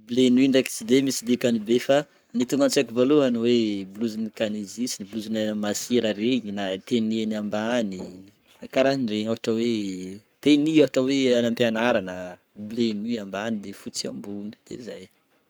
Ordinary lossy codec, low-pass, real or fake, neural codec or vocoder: none; none; fake; codec, 44.1 kHz, 7.8 kbps, Pupu-Codec